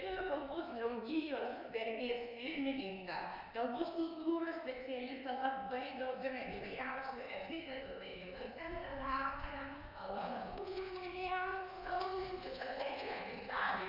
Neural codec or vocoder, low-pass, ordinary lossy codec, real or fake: codec, 24 kHz, 1.2 kbps, DualCodec; 5.4 kHz; MP3, 48 kbps; fake